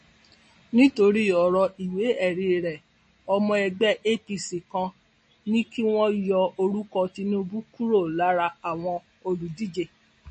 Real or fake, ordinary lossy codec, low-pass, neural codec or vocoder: real; MP3, 32 kbps; 10.8 kHz; none